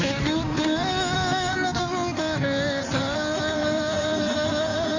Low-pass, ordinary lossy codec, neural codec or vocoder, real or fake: 7.2 kHz; Opus, 64 kbps; codec, 16 kHz in and 24 kHz out, 1.1 kbps, FireRedTTS-2 codec; fake